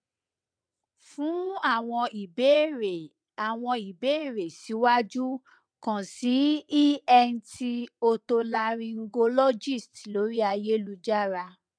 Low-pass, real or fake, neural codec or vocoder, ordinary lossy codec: 9.9 kHz; fake; vocoder, 22.05 kHz, 80 mel bands, WaveNeXt; none